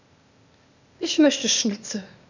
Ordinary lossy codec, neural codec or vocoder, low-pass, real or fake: none; codec, 16 kHz, 0.8 kbps, ZipCodec; 7.2 kHz; fake